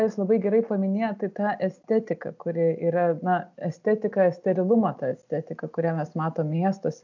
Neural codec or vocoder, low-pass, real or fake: none; 7.2 kHz; real